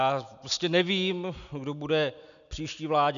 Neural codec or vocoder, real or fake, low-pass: none; real; 7.2 kHz